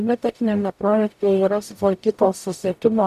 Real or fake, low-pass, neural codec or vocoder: fake; 14.4 kHz; codec, 44.1 kHz, 0.9 kbps, DAC